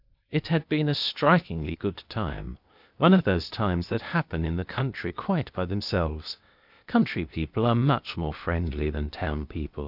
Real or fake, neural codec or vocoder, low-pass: fake; codec, 16 kHz, 0.8 kbps, ZipCodec; 5.4 kHz